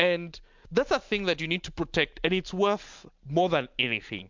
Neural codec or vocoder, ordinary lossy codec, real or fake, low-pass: none; MP3, 64 kbps; real; 7.2 kHz